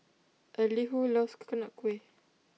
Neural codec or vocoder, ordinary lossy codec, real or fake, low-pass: none; none; real; none